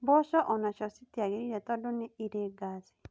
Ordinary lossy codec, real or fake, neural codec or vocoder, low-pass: none; real; none; none